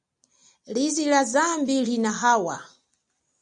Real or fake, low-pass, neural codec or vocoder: real; 9.9 kHz; none